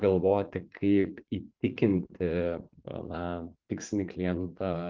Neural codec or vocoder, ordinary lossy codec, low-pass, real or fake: vocoder, 44.1 kHz, 80 mel bands, Vocos; Opus, 24 kbps; 7.2 kHz; fake